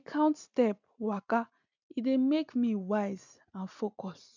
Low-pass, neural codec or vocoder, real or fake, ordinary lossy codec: 7.2 kHz; none; real; none